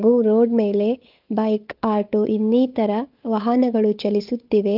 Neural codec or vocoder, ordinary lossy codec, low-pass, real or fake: codec, 16 kHz, 8 kbps, FunCodec, trained on Chinese and English, 25 frames a second; Opus, 24 kbps; 5.4 kHz; fake